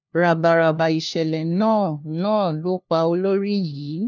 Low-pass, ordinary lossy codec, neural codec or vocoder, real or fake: 7.2 kHz; none; codec, 16 kHz, 1 kbps, FunCodec, trained on LibriTTS, 50 frames a second; fake